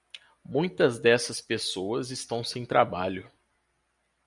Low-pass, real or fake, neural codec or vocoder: 10.8 kHz; real; none